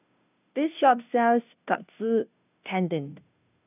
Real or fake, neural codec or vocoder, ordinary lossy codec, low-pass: fake; codec, 16 kHz, 0.5 kbps, FunCodec, trained on Chinese and English, 25 frames a second; none; 3.6 kHz